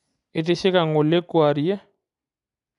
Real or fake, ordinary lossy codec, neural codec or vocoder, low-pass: real; none; none; 10.8 kHz